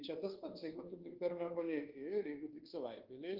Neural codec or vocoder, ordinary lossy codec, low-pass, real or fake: codec, 24 kHz, 1.2 kbps, DualCodec; Opus, 16 kbps; 5.4 kHz; fake